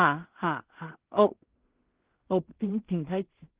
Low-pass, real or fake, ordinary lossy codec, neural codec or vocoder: 3.6 kHz; fake; Opus, 16 kbps; codec, 16 kHz in and 24 kHz out, 0.4 kbps, LongCat-Audio-Codec, two codebook decoder